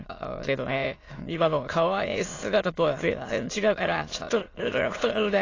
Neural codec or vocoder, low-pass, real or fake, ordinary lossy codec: autoencoder, 22.05 kHz, a latent of 192 numbers a frame, VITS, trained on many speakers; 7.2 kHz; fake; AAC, 32 kbps